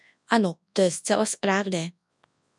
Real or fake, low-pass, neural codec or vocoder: fake; 10.8 kHz; codec, 24 kHz, 0.9 kbps, WavTokenizer, large speech release